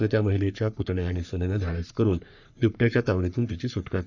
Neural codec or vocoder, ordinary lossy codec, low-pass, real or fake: codec, 44.1 kHz, 3.4 kbps, Pupu-Codec; none; 7.2 kHz; fake